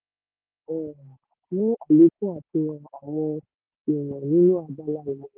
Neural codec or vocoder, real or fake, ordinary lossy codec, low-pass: none; real; Opus, 16 kbps; 3.6 kHz